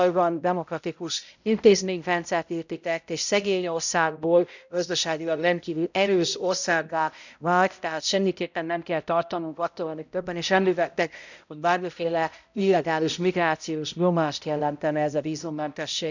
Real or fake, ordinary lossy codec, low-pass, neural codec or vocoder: fake; none; 7.2 kHz; codec, 16 kHz, 0.5 kbps, X-Codec, HuBERT features, trained on balanced general audio